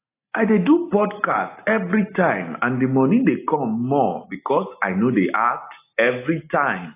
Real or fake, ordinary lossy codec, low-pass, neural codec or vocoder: real; AAC, 24 kbps; 3.6 kHz; none